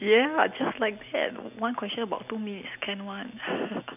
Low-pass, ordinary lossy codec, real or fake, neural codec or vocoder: 3.6 kHz; none; real; none